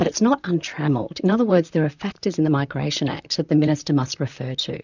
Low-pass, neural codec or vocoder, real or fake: 7.2 kHz; vocoder, 44.1 kHz, 128 mel bands, Pupu-Vocoder; fake